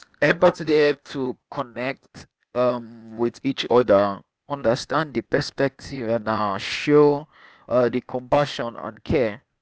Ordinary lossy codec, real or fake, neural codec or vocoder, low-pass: none; fake; codec, 16 kHz, 0.8 kbps, ZipCodec; none